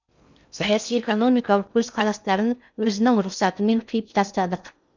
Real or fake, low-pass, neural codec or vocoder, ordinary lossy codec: fake; 7.2 kHz; codec, 16 kHz in and 24 kHz out, 0.6 kbps, FocalCodec, streaming, 2048 codes; none